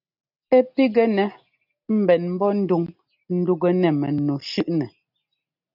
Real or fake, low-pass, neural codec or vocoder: real; 5.4 kHz; none